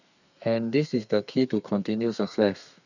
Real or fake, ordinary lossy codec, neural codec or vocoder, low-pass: fake; none; codec, 32 kHz, 1.9 kbps, SNAC; 7.2 kHz